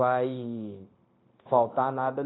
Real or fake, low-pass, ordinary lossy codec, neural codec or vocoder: fake; 7.2 kHz; AAC, 16 kbps; codec, 16 kHz, 0.9 kbps, LongCat-Audio-Codec